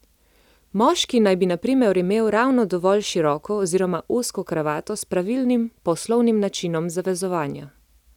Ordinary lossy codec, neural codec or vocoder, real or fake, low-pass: none; none; real; 19.8 kHz